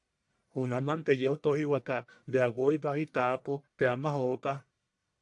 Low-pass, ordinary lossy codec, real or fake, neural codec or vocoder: 10.8 kHz; AAC, 64 kbps; fake; codec, 44.1 kHz, 1.7 kbps, Pupu-Codec